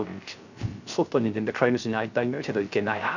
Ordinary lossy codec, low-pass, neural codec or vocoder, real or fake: none; 7.2 kHz; codec, 16 kHz, 0.3 kbps, FocalCodec; fake